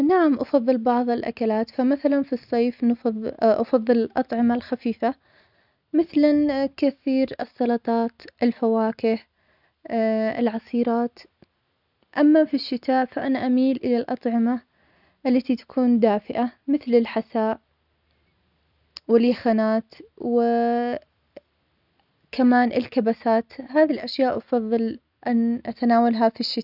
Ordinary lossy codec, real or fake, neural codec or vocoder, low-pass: none; real; none; 5.4 kHz